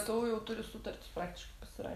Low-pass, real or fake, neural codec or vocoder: 14.4 kHz; real; none